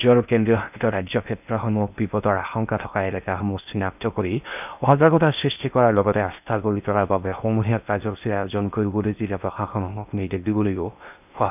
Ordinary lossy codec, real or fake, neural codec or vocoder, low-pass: none; fake; codec, 16 kHz in and 24 kHz out, 0.6 kbps, FocalCodec, streaming, 4096 codes; 3.6 kHz